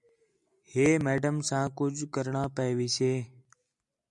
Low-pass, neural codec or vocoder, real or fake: 10.8 kHz; none; real